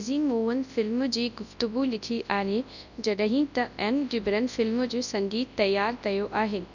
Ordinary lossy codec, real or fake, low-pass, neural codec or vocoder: none; fake; 7.2 kHz; codec, 24 kHz, 0.9 kbps, WavTokenizer, large speech release